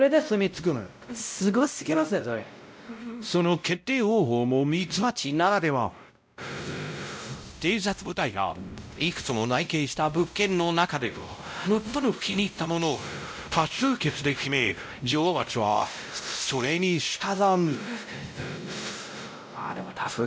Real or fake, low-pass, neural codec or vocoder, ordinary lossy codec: fake; none; codec, 16 kHz, 0.5 kbps, X-Codec, WavLM features, trained on Multilingual LibriSpeech; none